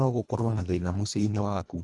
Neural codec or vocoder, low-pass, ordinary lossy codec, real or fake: codec, 24 kHz, 1.5 kbps, HILCodec; 10.8 kHz; none; fake